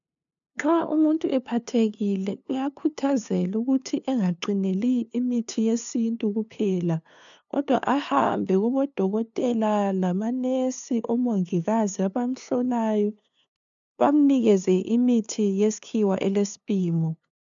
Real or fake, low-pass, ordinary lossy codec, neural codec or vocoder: fake; 7.2 kHz; MP3, 96 kbps; codec, 16 kHz, 2 kbps, FunCodec, trained on LibriTTS, 25 frames a second